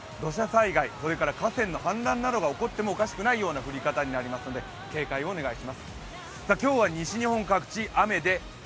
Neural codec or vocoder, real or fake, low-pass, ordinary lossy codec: none; real; none; none